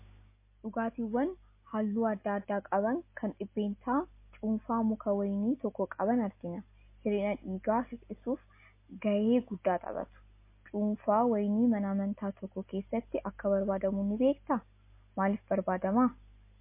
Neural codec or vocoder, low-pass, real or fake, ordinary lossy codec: none; 3.6 kHz; real; MP3, 24 kbps